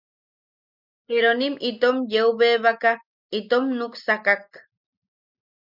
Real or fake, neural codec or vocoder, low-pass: real; none; 5.4 kHz